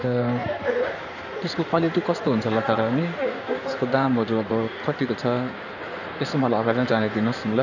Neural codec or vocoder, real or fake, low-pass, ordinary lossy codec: codec, 16 kHz in and 24 kHz out, 2.2 kbps, FireRedTTS-2 codec; fake; 7.2 kHz; none